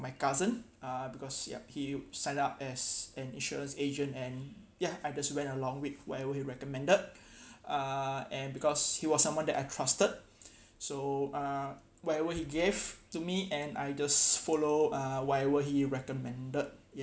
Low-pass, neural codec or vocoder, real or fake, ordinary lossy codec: none; none; real; none